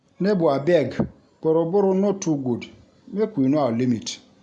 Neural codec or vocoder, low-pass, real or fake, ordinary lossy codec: none; 10.8 kHz; real; none